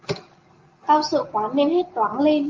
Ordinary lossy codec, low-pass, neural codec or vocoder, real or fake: Opus, 32 kbps; 7.2 kHz; none; real